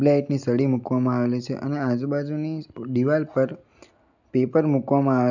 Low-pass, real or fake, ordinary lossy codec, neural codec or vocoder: 7.2 kHz; real; none; none